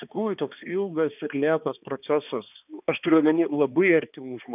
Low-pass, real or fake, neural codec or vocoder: 3.6 kHz; fake; codec, 16 kHz, 2 kbps, X-Codec, HuBERT features, trained on balanced general audio